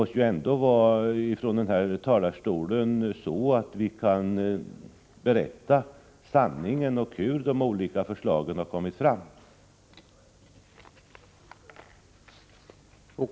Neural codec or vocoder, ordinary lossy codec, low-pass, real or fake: none; none; none; real